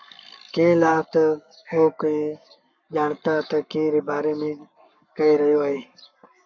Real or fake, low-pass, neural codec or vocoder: fake; 7.2 kHz; codec, 44.1 kHz, 7.8 kbps, Pupu-Codec